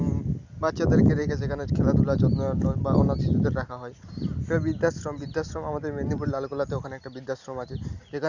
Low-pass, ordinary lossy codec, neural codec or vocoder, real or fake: 7.2 kHz; none; none; real